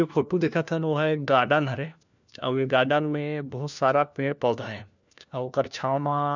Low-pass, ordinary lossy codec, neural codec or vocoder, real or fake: 7.2 kHz; none; codec, 16 kHz, 1 kbps, FunCodec, trained on LibriTTS, 50 frames a second; fake